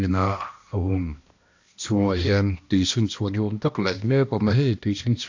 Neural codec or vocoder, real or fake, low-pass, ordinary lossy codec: codec, 16 kHz, 1 kbps, X-Codec, HuBERT features, trained on balanced general audio; fake; 7.2 kHz; AAC, 48 kbps